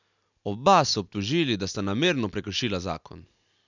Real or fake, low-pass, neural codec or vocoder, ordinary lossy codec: real; 7.2 kHz; none; none